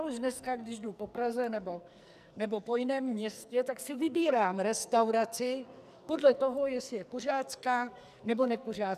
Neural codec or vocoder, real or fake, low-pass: codec, 44.1 kHz, 2.6 kbps, SNAC; fake; 14.4 kHz